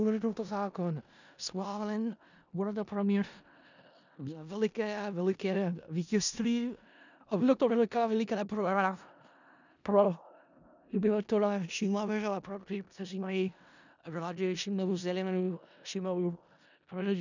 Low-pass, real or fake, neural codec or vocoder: 7.2 kHz; fake; codec, 16 kHz in and 24 kHz out, 0.4 kbps, LongCat-Audio-Codec, four codebook decoder